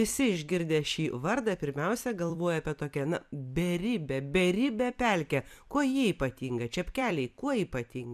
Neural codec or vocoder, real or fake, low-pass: vocoder, 44.1 kHz, 128 mel bands every 256 samples, BigVGAN v2; fake; 14.4 kHz